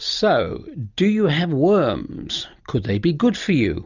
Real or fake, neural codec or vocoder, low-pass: real; none; 7.2 kHz